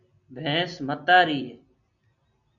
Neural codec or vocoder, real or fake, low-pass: none; real; 7.2 kHz